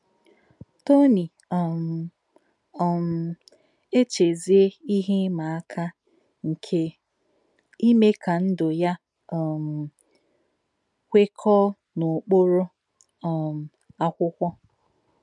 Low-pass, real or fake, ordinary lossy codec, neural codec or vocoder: 10.8 kHz; real; none; none